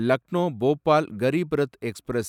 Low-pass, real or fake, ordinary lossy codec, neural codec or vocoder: 19.8 kHz; fake; none; vocoder, 44.1 kHz, 128 mel bands every 256 samples, BigVGAN v2